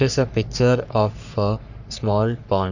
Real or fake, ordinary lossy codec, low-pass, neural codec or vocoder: fake; none; 7.2 kHz; codec, 44.1 kHz, 3.4 kbps, Pupu-Codec